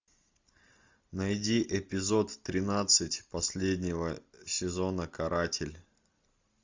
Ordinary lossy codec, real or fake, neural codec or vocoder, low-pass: MP3, 64 kbps; real; none; 7.2 kHz